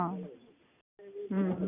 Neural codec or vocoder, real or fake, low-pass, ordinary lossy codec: none; real; 3.6 kHz; none